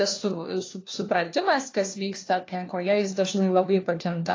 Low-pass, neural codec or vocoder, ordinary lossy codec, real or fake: 7.2 kHz; codec, 16 kHz, 1 kbps, FunCodec, trained on LibriTTS, 50 frames a second; AAC, 32 kbps; fake